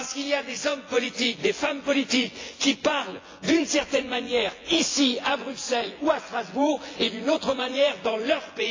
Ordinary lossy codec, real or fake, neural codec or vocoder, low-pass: AAC, 32 kbps; fake; vocoder, 24 kHz, 100 mel bands, Vocos; 7.2 kHz